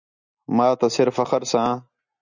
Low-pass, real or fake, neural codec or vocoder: 7.2 kHz; real; none